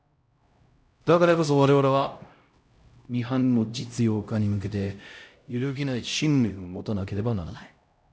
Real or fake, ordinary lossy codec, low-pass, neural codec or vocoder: fake; none; none; codec, 16 kHz, 0.5 kbps, X-Codec, HuBERT features, trained on LibriSpeech